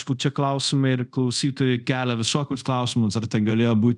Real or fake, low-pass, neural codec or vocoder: fake; 10.8 kHz; codec, 24 kHz, 0.5 kbps, DualCodec